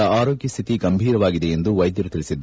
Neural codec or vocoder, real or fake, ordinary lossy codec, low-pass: none; real; none; none